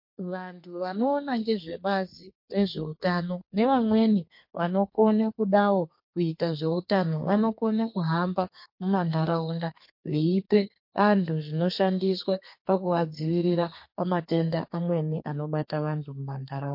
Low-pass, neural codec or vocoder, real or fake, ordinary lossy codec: 5.4 kHz; codec, 32 kHz, 1.9 kbps, SNAC; fake; MP3, 32 kbps